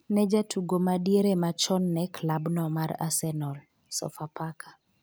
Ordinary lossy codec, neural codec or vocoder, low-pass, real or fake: none; none; none; real